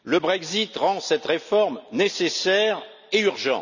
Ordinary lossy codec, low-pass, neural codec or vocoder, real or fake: none; 7.2 kHz; none; real